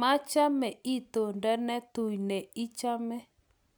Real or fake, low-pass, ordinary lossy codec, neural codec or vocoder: real; none; none; none